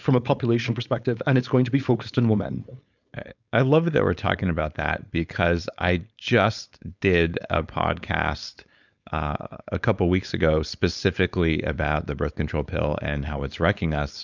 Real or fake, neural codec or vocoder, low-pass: fake; codec, 16 kHz, 4.8 kbps, FACodec; 7.2 kHz